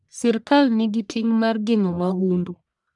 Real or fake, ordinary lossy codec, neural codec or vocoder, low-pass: fake; none; codec, 44.1 kHz, 1.7 kbps, Pupu-Codec; 10.8 kHz